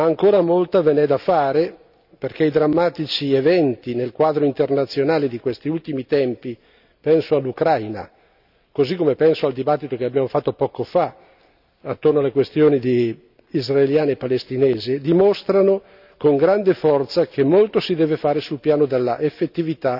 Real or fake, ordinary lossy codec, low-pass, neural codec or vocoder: real; none; 5.4 kHz; none